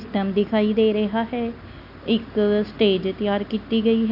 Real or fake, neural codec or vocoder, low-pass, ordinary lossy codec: real; none; 5.4 kHz; none